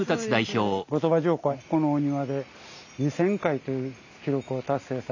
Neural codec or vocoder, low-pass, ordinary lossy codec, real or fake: none; 7.2 kHz; none; real